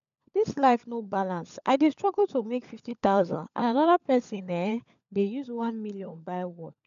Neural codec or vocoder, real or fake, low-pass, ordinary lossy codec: codec, 16 kHz, 16 kbps, FunCodec, trained on LibriTTS, 50 frames a second; fake; 7.2 kHz; none